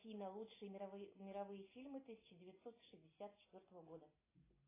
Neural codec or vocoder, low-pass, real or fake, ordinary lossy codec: none; 3.6 kHz; real; AAC, 16 kbps